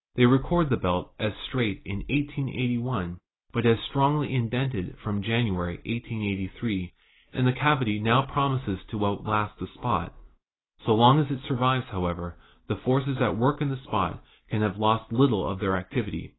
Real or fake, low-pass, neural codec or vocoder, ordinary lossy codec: real; 7.2 kHz; none; AAC, 16 kbps